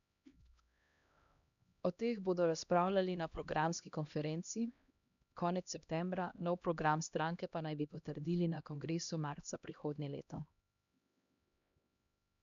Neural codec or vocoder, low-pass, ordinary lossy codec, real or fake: codec, 16 kHz, 1 kbps, X-Codec, HuBERT features, trained on LibriSpeech; 7.2 kHz; none; fake